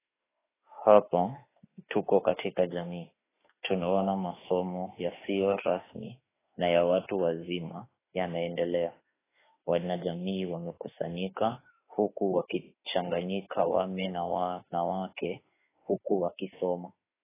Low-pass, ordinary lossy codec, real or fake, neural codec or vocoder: 3.6 kHz; AAC, 16 kbps; fake; autoencoder, 48 kHz, 32 numbers a frame, DAC-VAE, trained on Japanese speech